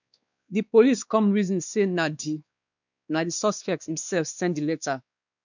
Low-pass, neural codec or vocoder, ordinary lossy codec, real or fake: 7.2 kHz; codec, 16 kHz, 2 kbps, X-Codec, WavLM features, trained on Multilingual LibriSpeech; none; fake